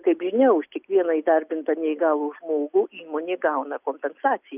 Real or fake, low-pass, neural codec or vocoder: real; 3.6 kHz; none